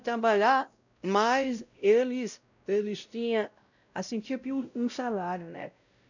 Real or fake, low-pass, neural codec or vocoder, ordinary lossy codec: fake; 7.2 kHz; codec, 16 kHz, 0.5 kbps, X-Codec, WavLM features, trained on Multilingual LibriSpeech; none